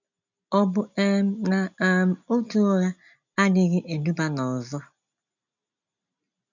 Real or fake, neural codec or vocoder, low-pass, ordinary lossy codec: real; none; 7.2 kHz; none